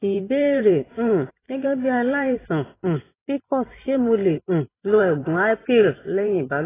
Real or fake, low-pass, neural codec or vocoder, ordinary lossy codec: fake; 3.6 kHz; vocoder, 44.1 kHz, 128 mel bands every 512 samples, BigVGAN v2; AAC, 16 kbps